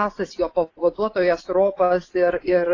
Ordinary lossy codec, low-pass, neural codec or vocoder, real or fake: AAC, 32 kbps; 7.2 kHz; vocoder, 24 kHz, 100 mel bands, Vocos; fake